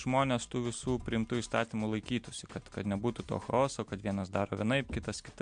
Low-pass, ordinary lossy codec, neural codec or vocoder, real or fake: 9.9 kHz; MP3, 64 kbps; none; real